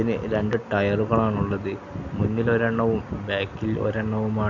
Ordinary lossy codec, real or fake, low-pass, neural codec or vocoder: none; real; 7.2 kHz; none